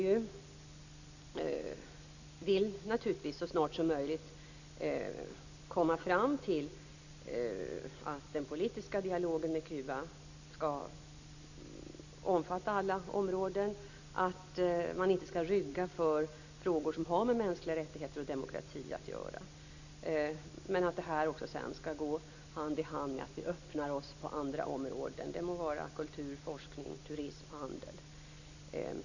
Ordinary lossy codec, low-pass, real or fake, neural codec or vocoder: none; 7.2 kHz; real; none